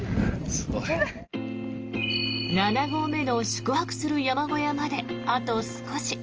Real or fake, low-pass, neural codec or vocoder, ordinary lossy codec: real; 7.2 kHz; none; Opus, 24 kbps